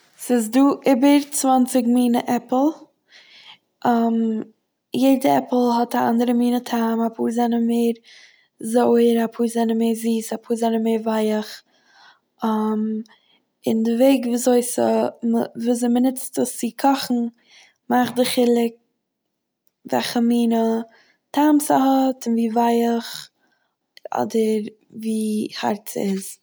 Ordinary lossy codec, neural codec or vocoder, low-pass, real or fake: none; none; none; real